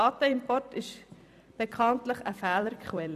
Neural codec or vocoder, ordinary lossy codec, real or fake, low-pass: vocoder, 44.1 kHz, 128 mel bands every 256 samples, BigVGAN v2; none; fake; 14.4 kHz